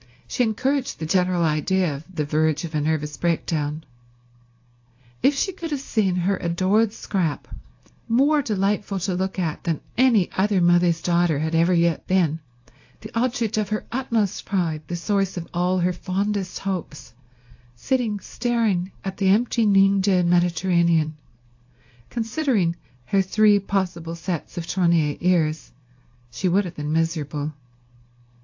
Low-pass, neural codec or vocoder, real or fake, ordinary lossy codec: 7.2 kHz; codec, 24 kHz, 0.9 kbps, WavTokenizer, medium speech release version 1; fake; AAC, 48 kbps